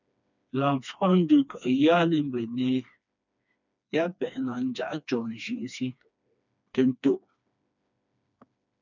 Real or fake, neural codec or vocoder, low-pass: fake; codec, 16 kHz, 2 kbps, FreqCodec, smaller model; 7.2 kHz